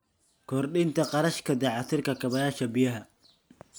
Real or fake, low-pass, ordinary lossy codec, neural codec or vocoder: real; none; none; none